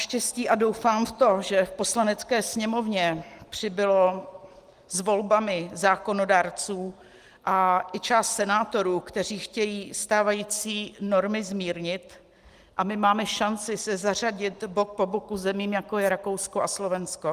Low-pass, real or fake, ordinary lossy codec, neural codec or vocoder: 14.4 kHz; real; Opus, 24 kbps; none